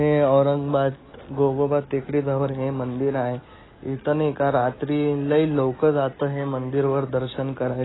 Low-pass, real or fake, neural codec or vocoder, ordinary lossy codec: 7.2 kHz; real; none; AAC, 16 kbps